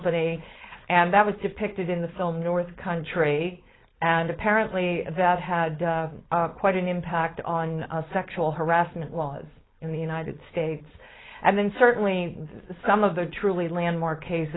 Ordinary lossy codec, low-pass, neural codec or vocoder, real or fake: AAC, 16 kbps; 7.2 kHz; codec, 16 kHz, 4.8 kbps, FACodec; fake